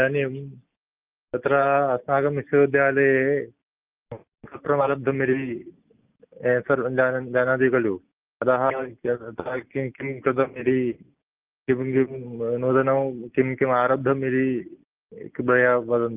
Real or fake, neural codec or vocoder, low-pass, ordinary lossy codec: real; none; 3.6 kHz; Opus, 24 kbps